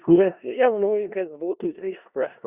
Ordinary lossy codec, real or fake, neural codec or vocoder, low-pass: Opus, 64 kbps; fake; codec, 16 kHz in and 24 kHz out, 0.4 kbps, LongCat-Audio-Codec, four codebook decoder; 3.6 kHz